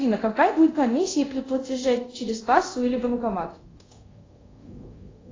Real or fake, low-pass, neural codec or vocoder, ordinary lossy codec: fake; 7.2 kHz; codec, 24 kHz, 0.5 kbps, DualCodec; AAC, 32 kbps